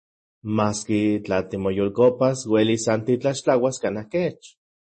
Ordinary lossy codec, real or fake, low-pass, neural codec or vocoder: MP3, 32 kbps; real; 10.8 kHz; none